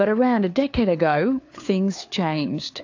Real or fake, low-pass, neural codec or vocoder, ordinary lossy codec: fake; 7.2 kHz; codec, 16 kHz, 4 kbps, FunCodec, trained on LibriTTS, 50 frames a second; AAC, 48 kbps